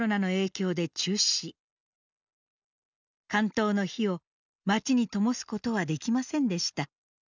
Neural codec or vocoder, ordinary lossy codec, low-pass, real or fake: none; none; 7.2 kHz; real